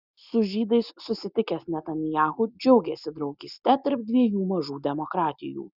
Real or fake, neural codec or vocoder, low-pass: real; none; 5.4 kHz